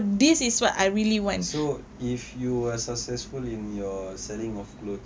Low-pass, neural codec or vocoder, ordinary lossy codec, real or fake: none; none; none; real